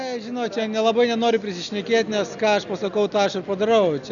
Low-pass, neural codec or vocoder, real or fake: 7.2 kHz; none; real